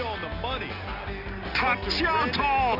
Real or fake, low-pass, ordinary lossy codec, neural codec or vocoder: real; 5.4 kHz; none; none